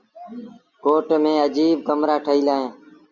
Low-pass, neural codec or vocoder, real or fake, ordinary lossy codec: 7.2 kHz; none; real; Opus, 64 kbps